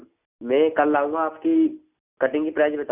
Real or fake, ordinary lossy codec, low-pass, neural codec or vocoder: real; none; 3.6 kHz; none